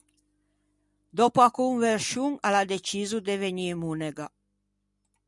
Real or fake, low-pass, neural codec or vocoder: real; 10.8 kHz; none